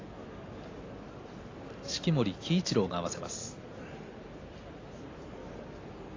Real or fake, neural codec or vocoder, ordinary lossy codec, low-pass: real; none; AAC, 32 kbps; 7.2 kHz